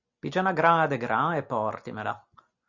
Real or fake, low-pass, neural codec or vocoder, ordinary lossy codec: real; 7.2 kHz; none; Opus, 64 kbps